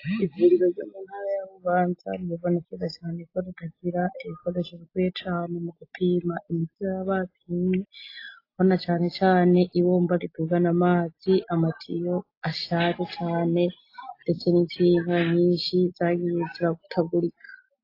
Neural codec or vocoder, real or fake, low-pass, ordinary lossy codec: none; real; 5.4 kHz; AAC, 32 kbps